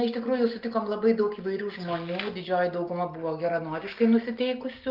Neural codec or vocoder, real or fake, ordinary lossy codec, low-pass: none; real; Opus, 24 kbps; 5.4 kHz